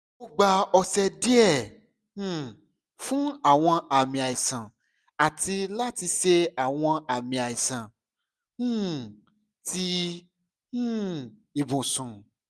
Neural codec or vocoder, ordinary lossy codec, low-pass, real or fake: none; none; none; real